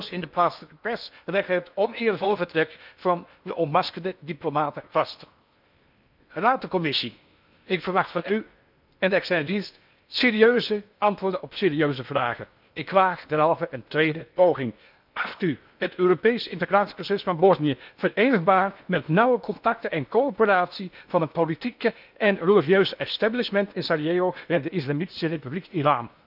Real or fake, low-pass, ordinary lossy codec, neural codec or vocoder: fake; 5.4 kHz; none; codec, 16 kHz in and 24 kHz out, 0.8 kbps, FocalCodec, streaming, 65536 codes